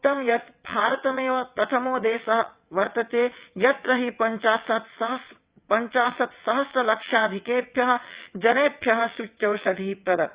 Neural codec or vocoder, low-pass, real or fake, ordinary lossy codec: vocoder, 22.05 kHz, 80 mel bands, WaveNeXt; 3.6 kHz; fake; Opus, 64 kbps